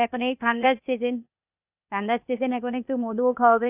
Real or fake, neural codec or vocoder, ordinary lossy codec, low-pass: fake; codec, 16 kHz, 0.8 kbps, ZipCodec; none; 3.6 kHz